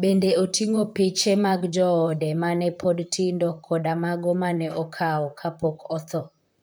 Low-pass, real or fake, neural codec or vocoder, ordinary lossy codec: none; fake; vocoder, 44.1 kHz, 128 mel bands, Pupu-Vocoder; none